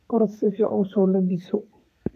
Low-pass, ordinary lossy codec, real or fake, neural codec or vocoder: 14.4 kHz; AAC, 96 kbps; fake; codec, 32 kHz, 1.9 kbps, SNAC